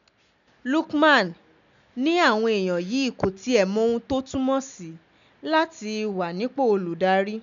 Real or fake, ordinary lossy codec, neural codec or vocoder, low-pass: real; none; none; 7.2 kHz